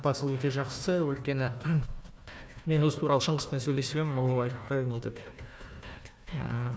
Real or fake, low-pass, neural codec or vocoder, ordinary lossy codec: fake; none; codec, 16 kHz, 1 kbps, FunCodec, trained on Chinese and English, 50 frames a second; none